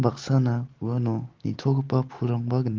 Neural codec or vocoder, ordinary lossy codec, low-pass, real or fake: none; Opus, 16 kbps; 7.2 kHz; real